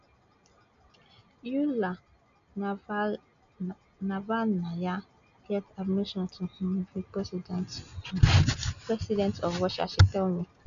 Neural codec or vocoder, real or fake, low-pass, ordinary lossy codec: none; real; 7.2 kHz; none